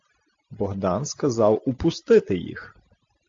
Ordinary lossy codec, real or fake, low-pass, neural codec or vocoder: AAC, 48 kbps; real; 7.2 kHz; none